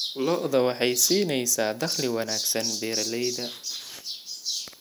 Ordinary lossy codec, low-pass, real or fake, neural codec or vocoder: none; none; real; none